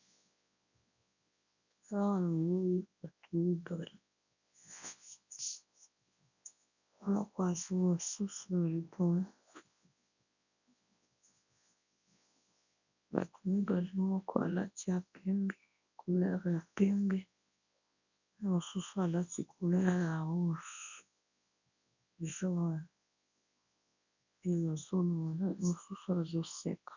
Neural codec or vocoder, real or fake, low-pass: codec, 24 kHz, 0.9 kbps, WavTokenizer, large speech release; fake; 7.2 kHz